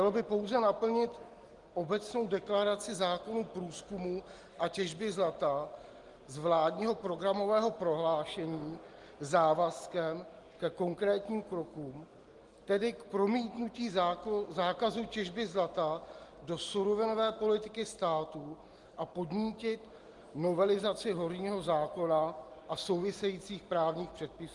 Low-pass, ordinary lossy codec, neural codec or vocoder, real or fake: 10.8 kHz; Opus, 24 kbps; none; real